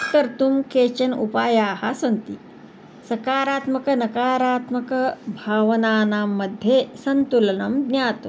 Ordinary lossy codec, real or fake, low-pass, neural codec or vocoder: none; real; none; none